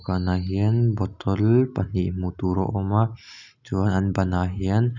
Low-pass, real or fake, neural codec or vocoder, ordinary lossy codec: 7.2 kHz; real; none; none